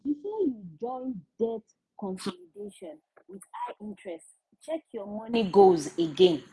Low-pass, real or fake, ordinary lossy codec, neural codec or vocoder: none; real; none; none